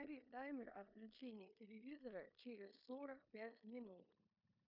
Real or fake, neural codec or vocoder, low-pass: fake; codec, 16 kHz in and 24 kHz out, 0.9 kbps, LongCat-Audio-Codec, four codebook decoder; 5.4 kHz